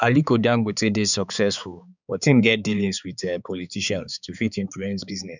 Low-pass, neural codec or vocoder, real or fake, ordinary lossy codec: 7.2 kHz; codec, 16 kHz, 4 kbps, X-Codec, HuBERT features, trained on balanced general audio; fake; none